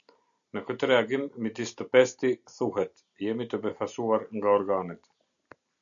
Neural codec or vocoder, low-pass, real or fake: none; 7.2 kHz; real